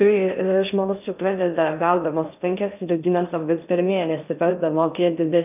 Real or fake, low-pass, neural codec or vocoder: fake; 3.6 kHz; codec, 16 kHz in and 24 kHz out, 0.6 kbps, FocalCodec, streaming, 2048 codes